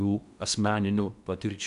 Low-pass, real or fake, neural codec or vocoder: 10.8 kHz; fake; codec, 16 kHz in and 24 kHz out, 0.8 kbps, FocalCodec, streaming, 65536 codes